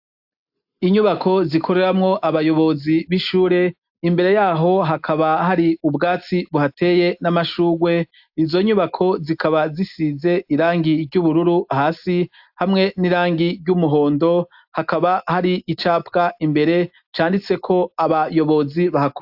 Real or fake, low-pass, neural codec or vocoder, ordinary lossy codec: real; 5.4 kHz; none; AAC, 48 kbps